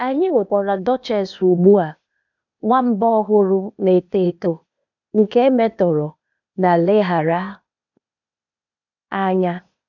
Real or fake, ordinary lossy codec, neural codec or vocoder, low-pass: fake; none; codec, 16 kHz, 0.8 kbps, ZipCodec; 7.2 kHz